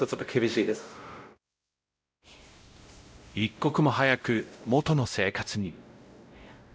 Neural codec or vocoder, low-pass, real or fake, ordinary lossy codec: codec, 16 kHz, 0.5 kbps, X-Codec, WavLM features, trained on Multilingual LibriSpeech; none; fake; none